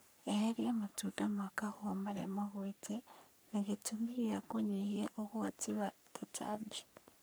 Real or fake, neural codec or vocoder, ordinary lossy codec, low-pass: fake; codec, 44.1 kHz, 3.4 kbps, Pupu-Codec; none; none